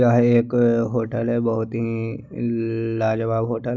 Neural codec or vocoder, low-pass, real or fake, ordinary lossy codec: none; 7.2 kHz; real; none